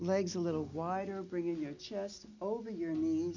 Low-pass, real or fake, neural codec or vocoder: 7.2 kHz; real; none